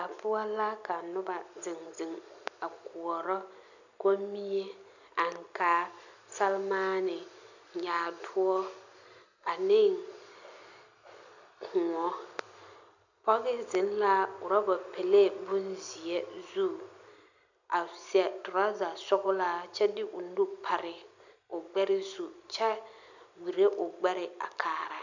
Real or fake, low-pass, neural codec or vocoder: real; 7.2 kHz; none